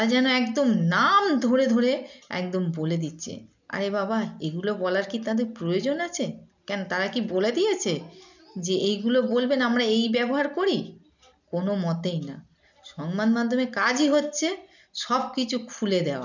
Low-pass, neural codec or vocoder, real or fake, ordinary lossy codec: 7.2 kHz; none; real; none